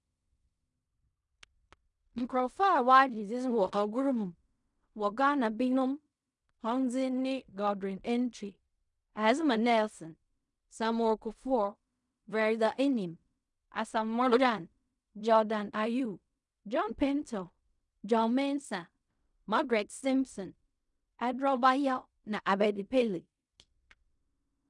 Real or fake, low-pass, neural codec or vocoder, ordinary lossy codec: fake; 10.8 kHz; codec, 16 kHz in and 24 kHz out, 0.4 kbps, LongCat-Audio-Codec, fine tuned four codebook decoder; none